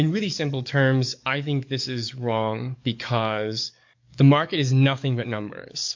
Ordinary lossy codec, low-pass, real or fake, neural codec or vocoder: MP3, 48 kbps; 7.2 kHz; fake; codec, 44.1 kHz, 7.8 kbps, DAC